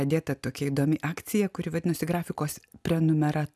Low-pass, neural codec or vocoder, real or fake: 14.4 kHz; none; real